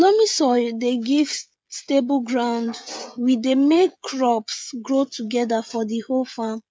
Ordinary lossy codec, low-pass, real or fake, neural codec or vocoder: none; none; fake; codec, 16 kHz, 16 kbps, FreqCodec, smaller model